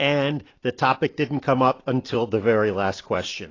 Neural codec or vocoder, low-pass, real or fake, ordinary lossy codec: none; 7.2 kHz; real; AAC, 32 kbps